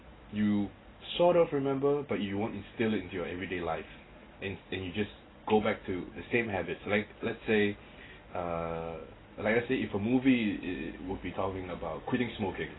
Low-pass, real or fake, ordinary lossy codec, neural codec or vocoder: 7.2 kHz; real; AAC, 16 kbps; none